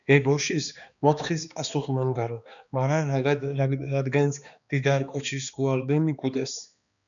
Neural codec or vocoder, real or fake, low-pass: codec, 16 kHz, 2 kbps, X-Codec, HuBERT features, trained on balanced general audio; fake; 7.2 kHz